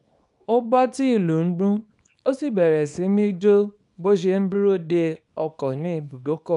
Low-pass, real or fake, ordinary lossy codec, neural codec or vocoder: 10.8 kHz; fake; none; codec, 24 kHz, 0.9 kbps, WavTokenizer, small release